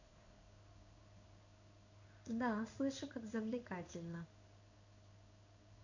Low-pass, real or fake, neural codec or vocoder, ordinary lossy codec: 7.2 kHz; fake; codec, 16 kHz in and 24 kHz out, 1 kbps, XY-Tokenizer; none